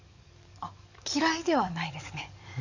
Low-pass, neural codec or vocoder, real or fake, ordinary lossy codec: 7.2 kHz; codec, 44.1 kHz, 7.8 kbps, DAC; fake; none